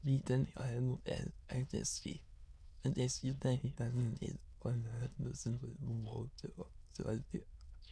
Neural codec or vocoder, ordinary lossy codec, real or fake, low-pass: autoencoder, 22.05 kHz, a latent of 192 numbers a frame, VITS, trained on many speakers; none; fake; none